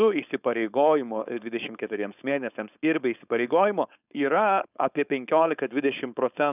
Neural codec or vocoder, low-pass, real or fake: codec, 16 kHz, 4.8 kbps, FACodec; 3.6 kHz; fake